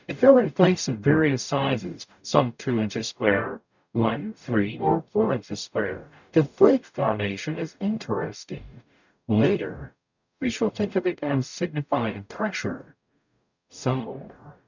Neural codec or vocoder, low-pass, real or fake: codec, 44.1 kHz, 0.9 kbps, DAC; 7.2 kHz; fake